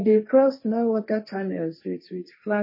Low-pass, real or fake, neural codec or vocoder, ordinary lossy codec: 5.4 kHz; fake; codec, 16 kHz, 1.1 kbps, Voila-Tokenizer; MP3, 24 kbps